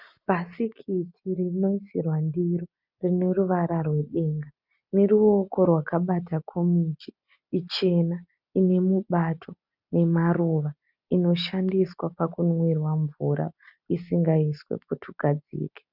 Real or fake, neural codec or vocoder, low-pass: real; none; 5.4 kHz